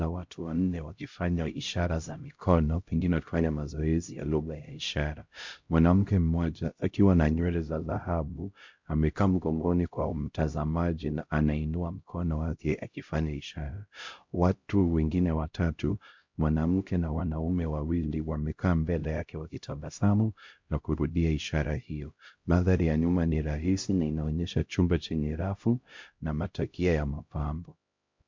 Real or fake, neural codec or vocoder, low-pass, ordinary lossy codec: fake; codec, 16 kHz, 0.5 kbps, X-Codec, HuBERT features, trained on LibriSpeech; 7.2 kHz; MP3, 48 kbps